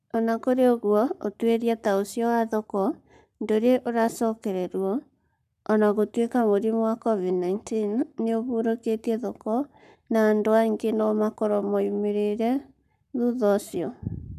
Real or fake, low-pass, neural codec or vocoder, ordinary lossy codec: fake; 14.4 kHz; codec, 44.1 kHz, 7.8 kbps, Pupu-Codec; none